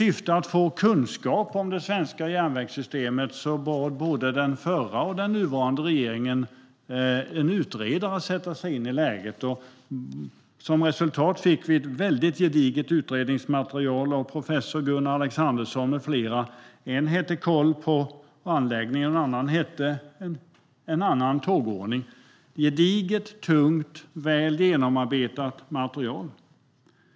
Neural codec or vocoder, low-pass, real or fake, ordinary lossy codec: none; none; real; none